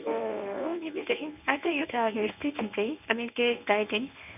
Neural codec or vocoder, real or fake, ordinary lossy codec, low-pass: codec, 24 kHz, 0.9 kbps, WavTokenizer, medium speech release version 1; fake; none; 3.6 kHz